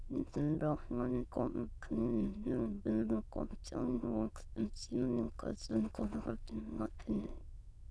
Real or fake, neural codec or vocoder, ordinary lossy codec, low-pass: fake; autoencoder, 22.05 kHz, a latent of 192 numbers a frame, VITS, trained on many speakers; none; none